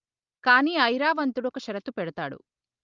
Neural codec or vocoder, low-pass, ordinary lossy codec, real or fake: none; 7.2 kHz; Opus, 24 kbps; real